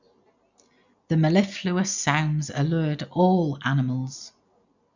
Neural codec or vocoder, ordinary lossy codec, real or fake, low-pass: none; none; real; 7.2 kHz